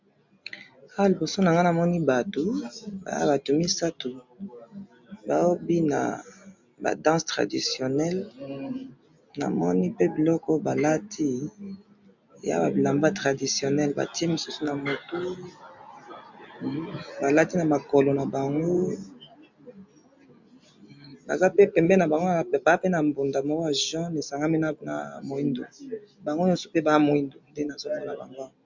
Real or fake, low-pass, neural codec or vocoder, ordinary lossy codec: real; 7.2 kHz; none; MP3, 64 kbps